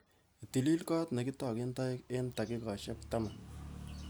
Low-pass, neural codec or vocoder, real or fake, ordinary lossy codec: none; none; real; none